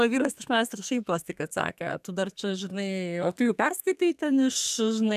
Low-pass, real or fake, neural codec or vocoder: 14.4 kHz; fake; codec, 32 kHz, 1.9 kbps, SNAC